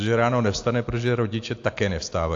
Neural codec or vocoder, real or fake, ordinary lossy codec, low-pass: none; real; AAC, 48 kbps; 7.2 kHz